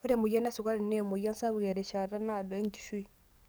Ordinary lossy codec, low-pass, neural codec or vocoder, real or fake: none; none; codec, 44.1 kHz, 7.8 kbps, DAC; fake